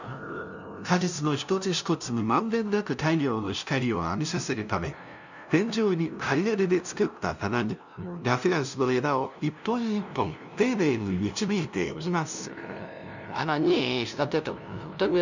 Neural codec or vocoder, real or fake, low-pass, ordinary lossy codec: codec, 16 kHz, 0.5 kbps, FunCodec, trained on LibriTTS, 25 frames a second; fake; 7.2 kHz; none